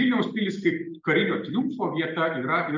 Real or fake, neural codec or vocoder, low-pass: real; none; 7.2 kHz